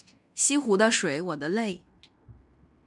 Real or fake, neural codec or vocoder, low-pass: fake; codec, 16 kHz in and 24 kHz out, 0.9 kbps, LongCat-Audio-Codec, fine tuned four codebook decoder; 10.8 kHz